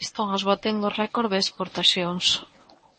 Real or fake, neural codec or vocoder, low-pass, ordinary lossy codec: fake; codec, 24 kHz, 0.9 kbps, WavTokenizer, medium speech release version 1; 10.8 kHz; MP3, 32 kbps